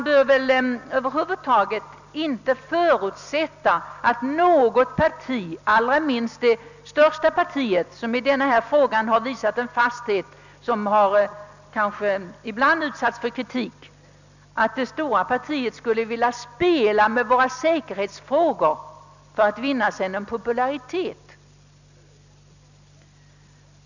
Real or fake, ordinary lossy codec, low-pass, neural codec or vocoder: real; none; 7.2 kHz; none